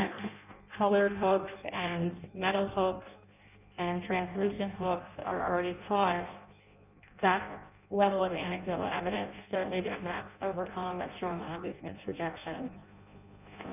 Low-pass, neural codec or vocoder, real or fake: 3.6 kHz; codec, 16 kHz in and 24 kHz out, 0.6 kbps, FireRedTTS-2 codec; fake